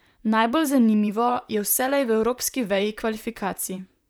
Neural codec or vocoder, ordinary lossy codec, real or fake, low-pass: vocoder, 44.1 kHz, 128 mel bands, Pupu-Vocoder; none; fake; none